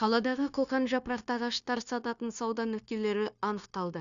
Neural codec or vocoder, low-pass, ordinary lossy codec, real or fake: codec, 16 kHz, 0.9 kbps, LongCat-Audio-Codec; 7.2 kHz; none; fake